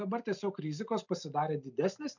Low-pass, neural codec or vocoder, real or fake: 7.2 kHz; none; real